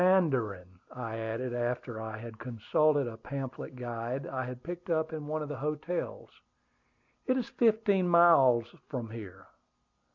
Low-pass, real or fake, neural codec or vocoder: 7.2 kHz; real; none